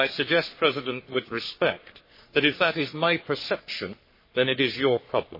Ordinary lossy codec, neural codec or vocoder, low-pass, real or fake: MP3, 24 kbps; codec, 44.1 kHz, 3.4 kbps, Pupu-Codec; 5.4 kHz; fake